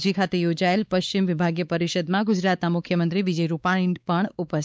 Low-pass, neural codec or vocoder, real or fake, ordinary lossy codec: none; codec, 16 kHz, 4 kbps, X-Codec, WavLM features, trained on Multilingual LibriSpeech; fake; none